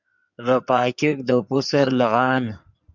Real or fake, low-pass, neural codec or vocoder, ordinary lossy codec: fake; 7.2 kHz; codec, 16 kHz in and 24 kHz out, 2.2 kbps, FireRedTTS-2 codec; MP3, 64 kbps